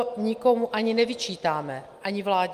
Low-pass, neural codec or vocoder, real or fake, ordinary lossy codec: 14.4 kHz; none; real; Opus, 32 kbps